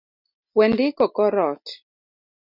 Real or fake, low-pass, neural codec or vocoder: real; 5.4 kHz; none